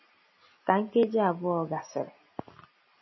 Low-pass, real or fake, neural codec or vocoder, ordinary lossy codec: 7.2 kHz; real; none; MP3, 24 kbps